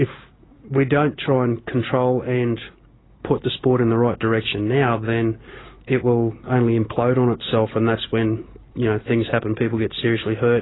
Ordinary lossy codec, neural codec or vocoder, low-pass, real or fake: AAC, 16 kbps; none; 7.2 kHz; real